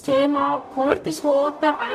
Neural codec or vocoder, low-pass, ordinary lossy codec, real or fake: codec, 44.1 kHz, 0.9 kbps, DAC; 14.4 kHz; none; fake